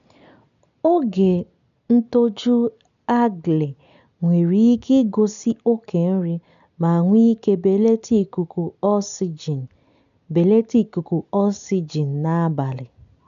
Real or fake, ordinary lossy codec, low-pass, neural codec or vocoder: real; none; 7.2 kHz; none